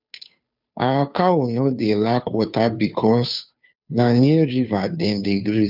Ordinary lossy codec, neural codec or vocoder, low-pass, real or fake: none; codec, 16 kHz, 2 kbps, FunCodec, trained on Chinese and English, 25 frames a second; 5.4 kHz; fake